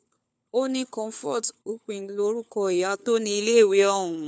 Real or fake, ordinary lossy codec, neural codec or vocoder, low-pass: fake; none; codec, 16 kHz, 4 kbps, FunCodec, trained on LibriTTS, 50 frames a second; none